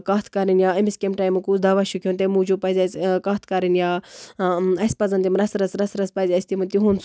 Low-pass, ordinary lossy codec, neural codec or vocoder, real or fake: none; none; none; real